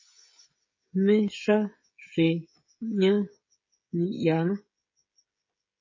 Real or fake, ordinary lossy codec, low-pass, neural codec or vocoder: fake; MP3, 32 kbps; 7.2 kHz; codec, 16 kHz, 16 kbps, FreqCodec, smaller model